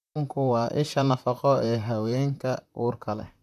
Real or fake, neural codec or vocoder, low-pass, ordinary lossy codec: fake; vocoder, 44.1 kHz, 128 mel bands, Pupu-Vocoder; 14.4 kHz; none